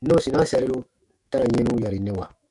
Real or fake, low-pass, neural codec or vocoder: fake; 10.8 kHz; autoencoder, 48 kHz, 128 numbers a frame, DAC-VAE, trained on Japanese speech